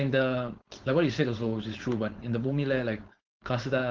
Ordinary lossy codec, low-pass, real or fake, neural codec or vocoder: Opus, 16 kbps; 7.2 kHz; fake; codec, 16 kHz, 4.8 kbps, FACodec